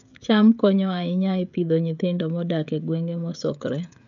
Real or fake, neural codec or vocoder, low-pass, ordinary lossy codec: fake; codec, 16 kHz, 16 kbps, FreqCodec, smaller model; 7.2 kHz; none